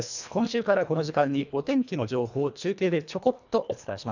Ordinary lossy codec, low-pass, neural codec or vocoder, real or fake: none; 7.2 kHz; codec, 24 kHz, 1.5 kbps, HILCodec; fake